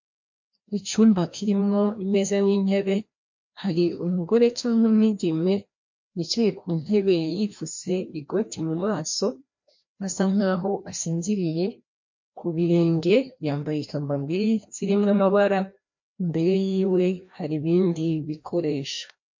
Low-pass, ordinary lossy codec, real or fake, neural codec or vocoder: 7.2 kHz; MP3, 48 kbps; fake; codec, 16 kHz, 1 kbps, FreqCodec, larger model